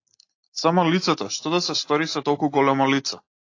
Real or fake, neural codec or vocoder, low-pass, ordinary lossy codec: real; none; 7.2 kHz; AAC, 48 kbps